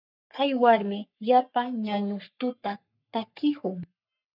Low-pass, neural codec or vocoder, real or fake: 5.4 kHz; codec, 44.1 kHz, 3.4 kbps, Pupu-Codec; fake